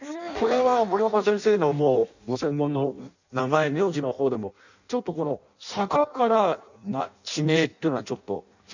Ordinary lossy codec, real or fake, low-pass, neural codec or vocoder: none; fake; 7.2 kHz; codec, 16 kHz in and 24 kHz out, 0.6 kbps, FireRedTTS-2 codec